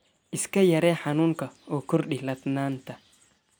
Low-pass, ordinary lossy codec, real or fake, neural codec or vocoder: none; none; real; none